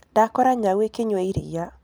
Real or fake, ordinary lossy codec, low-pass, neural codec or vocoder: real; none; none; none